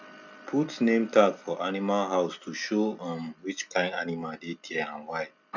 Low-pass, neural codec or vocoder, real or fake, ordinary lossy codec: 7.2 kHz; none; real; none